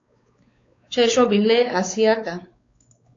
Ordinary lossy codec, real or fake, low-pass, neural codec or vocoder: AAC, 48 kbps; fake; 7.2 kHz; codec, 16 kHz, 4 kbps, X-Codec, WavLM features, trained on Multilingual LibriSpeech